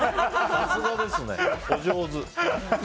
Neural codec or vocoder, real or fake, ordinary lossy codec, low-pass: none; real; none; none